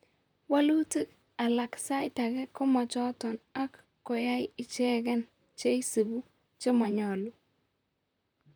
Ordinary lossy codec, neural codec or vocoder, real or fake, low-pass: none; vocoder, 44.1 kHz, 128 mel bands, Pupu-Vocoder; fake; none